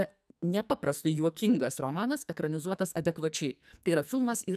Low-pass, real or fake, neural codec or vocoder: 14.4 kHz; fake; codec, 44.1 kHz, 2.6 kbps, SNAC